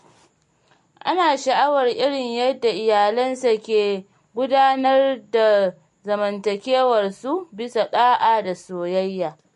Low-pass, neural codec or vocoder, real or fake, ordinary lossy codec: 14.4 kHz; none; real; MP3, 48 kbps